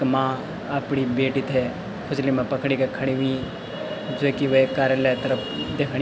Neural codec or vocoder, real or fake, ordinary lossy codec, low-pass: none; real; none; none